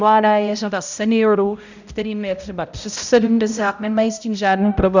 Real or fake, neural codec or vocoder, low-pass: fake; codec, 16 kHz, 0.5 kbps, X-Codec, HuBERT features, trained on balanced general audio; 7.2 kHz